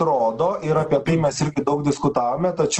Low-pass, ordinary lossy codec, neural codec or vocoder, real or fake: 10.8 kHz; Opus, 16 kbps; none; real